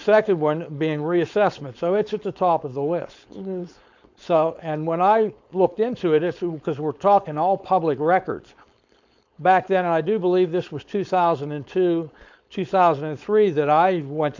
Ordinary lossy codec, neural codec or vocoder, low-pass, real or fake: MP3, 64 kbps; codec, 16 kHz, 4.8 kbps, FACodec; 7.2 kHz; fake